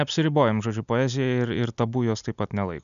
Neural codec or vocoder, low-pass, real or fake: none; 7.2 kHz; real